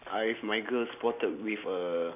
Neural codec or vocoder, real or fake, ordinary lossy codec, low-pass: none; real; none; 3.6 kHz